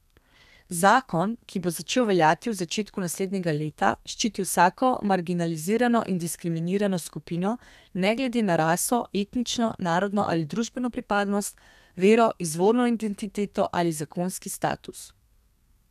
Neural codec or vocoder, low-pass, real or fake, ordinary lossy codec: codec, 32 kHz, 1.9 kbps, SNAC; 14.4 kHz; fake; none